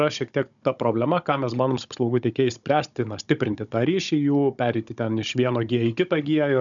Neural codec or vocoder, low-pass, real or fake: codec, 16 kHz, 16 kbps, FunCodec, trained on Chinese and English, 50 frames a second; 7.2 kHz; fake